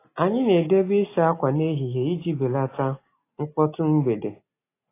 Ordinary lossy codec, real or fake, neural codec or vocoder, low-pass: AAC, 24 kbps; real; none; 3.6 kHz